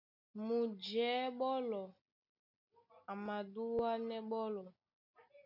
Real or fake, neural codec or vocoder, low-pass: real; none; 5.4 kHz